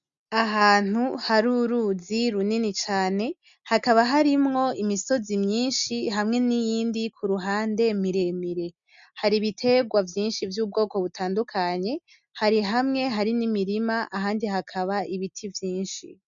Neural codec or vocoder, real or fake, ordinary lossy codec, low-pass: none; real; MP3, 96 kbps; 7.2 kHz